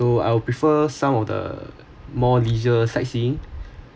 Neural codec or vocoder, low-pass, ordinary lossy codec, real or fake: none; none; none; real